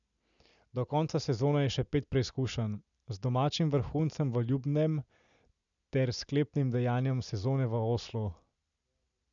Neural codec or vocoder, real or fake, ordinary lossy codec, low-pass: none; real; none; 7.2 kHz